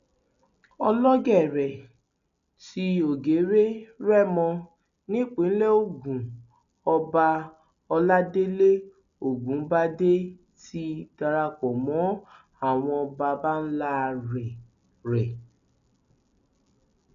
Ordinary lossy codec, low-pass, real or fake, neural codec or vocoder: none; 7.2 kHz; real; none